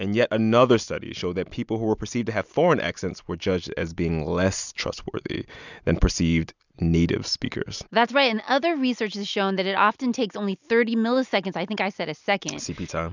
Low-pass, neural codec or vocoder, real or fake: 7.2 kHz; none; real